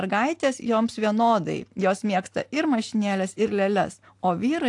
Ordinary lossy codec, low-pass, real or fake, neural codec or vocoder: AAC, 64 kbps; 10.8 kHz; real; none